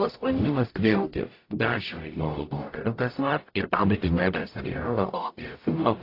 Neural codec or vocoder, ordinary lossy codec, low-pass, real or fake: codec, 44.1 kHz, 0.9 kbps, DAC; AAC, 32 kbps; 5.4 kHz; fake